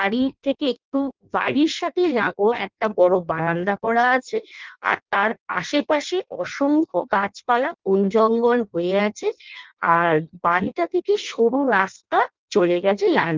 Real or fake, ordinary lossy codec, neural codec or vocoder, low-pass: fake; Opus, 24 kbps; codec, 16 kHz in and 24 kHz out, 0.6 kbps, FireRedTTS-2 codec; 7.2 kHz